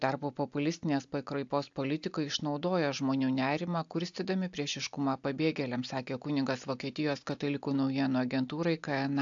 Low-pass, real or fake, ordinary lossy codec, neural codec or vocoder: 7.2 kHz; real; AAC, 64 kbps; none